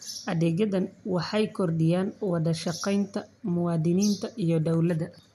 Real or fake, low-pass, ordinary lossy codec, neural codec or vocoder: real; 14.4 kHz; none; none